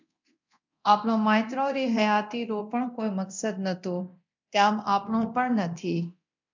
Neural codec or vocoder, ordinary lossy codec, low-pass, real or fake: codec, 24 kHz, 0.9 kbps, DualCodec; MP3, 64 kbps; 7.2 kHz; fake